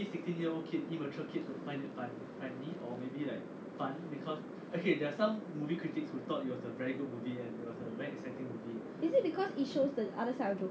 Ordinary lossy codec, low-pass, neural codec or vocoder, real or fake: none; none; none; real